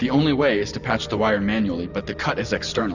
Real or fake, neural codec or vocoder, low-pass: real; none; 7.2 kHz